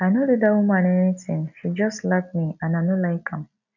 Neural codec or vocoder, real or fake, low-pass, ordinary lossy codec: none; real; 7.2 kHz; none